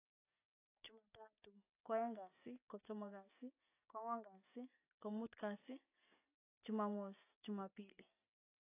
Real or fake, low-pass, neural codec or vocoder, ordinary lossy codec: real; 3.6 kHz; none; AAC, 24 kbps